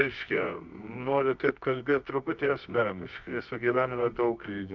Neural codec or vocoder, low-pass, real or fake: codec, 24 kHz, 0.9 kbps, WavTokenizer, medium music audio release; 7.2 kHz; fake